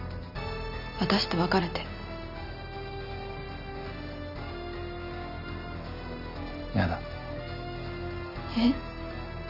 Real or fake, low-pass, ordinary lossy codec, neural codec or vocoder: real; 5.4 kHz; none; none